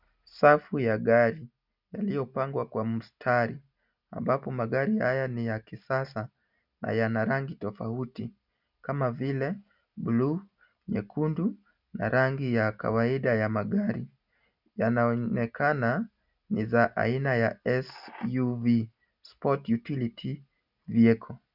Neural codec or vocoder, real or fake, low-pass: none; real; 5.4 kHz